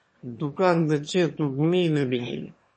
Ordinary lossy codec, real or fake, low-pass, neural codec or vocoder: MP3, 32 kbps; fake; 9.9 kHz; autoencoder, 22.05 kHz, a latent of 192 numbers a frame, VITS, trained on one speaker